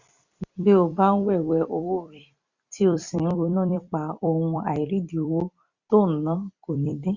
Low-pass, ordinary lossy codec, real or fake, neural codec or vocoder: 7.2 kHz; Opus, 64 kbps; fake; vocoder, 44.1 kHz, 128 mel bands every 256 samples, BigVGAN v2